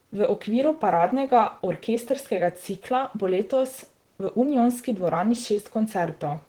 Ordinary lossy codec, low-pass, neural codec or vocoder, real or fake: Opus, 16 kbps; 19.8 kHz; vocoder, 44.1 kHz, 128 mel bands, Pupu-Vocoder; fake